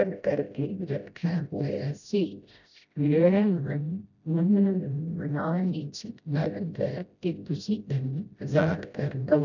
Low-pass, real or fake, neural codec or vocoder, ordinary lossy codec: 7.2 kHz; fake; codec, 16 kHz, 0.5 kbps, FreqCodec, smaller model; none